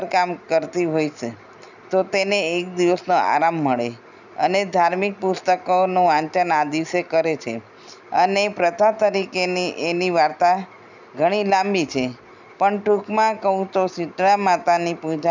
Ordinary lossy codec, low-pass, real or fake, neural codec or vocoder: none; 7.2 kHz; real; none